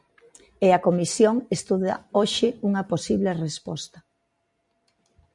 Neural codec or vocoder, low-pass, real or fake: none; 10.8 kHz; real